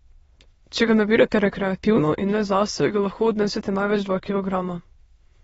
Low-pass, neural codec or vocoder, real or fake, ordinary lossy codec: 9.9 kHz; autoencoder, 22.05 kHz, a latent of 192 numbers a frame, VITS, trained on many speakers; fake; AAC, 24 kbps